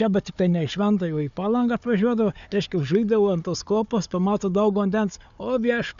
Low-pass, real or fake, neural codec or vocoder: 7.2 kHz; fake; codec, 16 kHz, 4 kbps, FunCodec, trained on Chinese and English, 50 frames a second